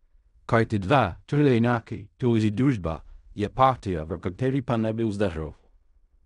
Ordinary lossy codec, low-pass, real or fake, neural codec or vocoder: none; 10.8 kHz; fake; codec, 16 kHz in and 24 kHz out, 0.4 kbps, LongCat-Audio-Codec, fine tuned four codebook decoder